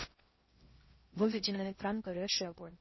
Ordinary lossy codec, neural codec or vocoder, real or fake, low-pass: MP3, 24 kbps; codec, 16 kHz in and 24 kHz out, 0.6 kbps, FocalCodec, streaming, 4096 codes; fake; 7.2 kHz